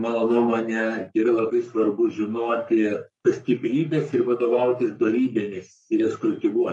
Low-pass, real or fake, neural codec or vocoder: 10.8 kHz; fake; codec, 44.1 kHz, 3.4 kbps, Pupu-Codec